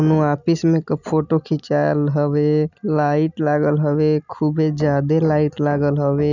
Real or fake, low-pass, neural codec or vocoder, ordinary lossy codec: real; 7.2 kHz; none; none